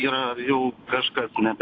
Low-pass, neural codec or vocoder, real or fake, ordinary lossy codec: 7.2 kHz; none; real; AAC, 32 kbps